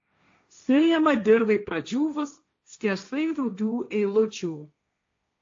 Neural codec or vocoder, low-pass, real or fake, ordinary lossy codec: codec, 16 kHz, 1.1 kbps, Voila-Tokenizer; 7.2 kHz; fake; AAC, 48 kbps